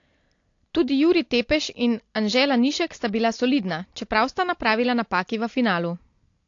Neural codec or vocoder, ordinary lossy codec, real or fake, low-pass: none; AAC, 48 kbps; real; 7.2 kHz